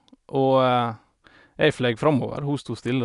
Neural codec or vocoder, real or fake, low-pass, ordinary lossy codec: none; real; 10.8 kHz; none